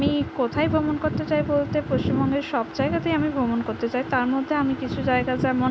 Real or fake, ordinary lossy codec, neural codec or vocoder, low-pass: real; none; none; none